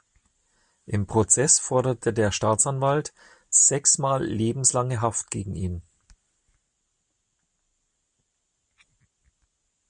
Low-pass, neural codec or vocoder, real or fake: 9.9 kHz; none; real